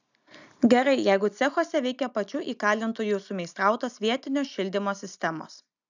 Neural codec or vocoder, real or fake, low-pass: none; real; 7.2 kHz